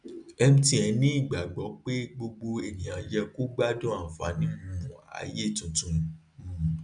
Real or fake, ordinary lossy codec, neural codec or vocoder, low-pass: real; none; none; 9.9 kHz